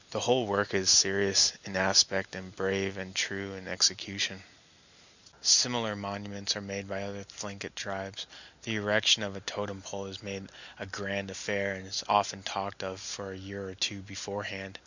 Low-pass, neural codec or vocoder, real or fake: 7.2 kHz; none; real